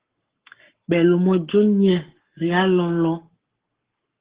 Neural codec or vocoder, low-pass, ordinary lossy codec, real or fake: codec, 44.1 kHz, 7.8 kbps, Pupu-Codec; 3.6 kHz; Opus, 16 kbps; fake